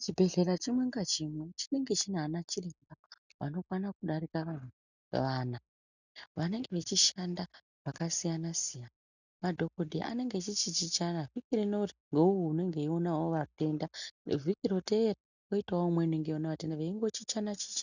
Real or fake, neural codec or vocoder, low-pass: real; none; 7.2 kHz